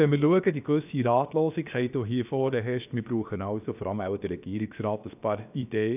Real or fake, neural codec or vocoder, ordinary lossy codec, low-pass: fake; codec, 16 kHz, about 1 kbps, DyCAST, with the encoder's durations; none; 3.6 kHz